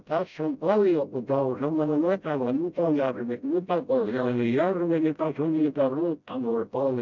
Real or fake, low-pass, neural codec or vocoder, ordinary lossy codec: fake; 7.2 kHz; codec, 16 kHz, 0.5 kbps, FreqCodec, smaller model; none